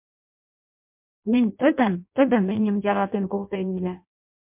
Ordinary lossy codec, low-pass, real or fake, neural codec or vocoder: MP3, 32 kbps; 3.6 kHz; fake; codec, 16 kHz in and 24 kHz out, 0.6 kbps, FireRedTTS-2 codec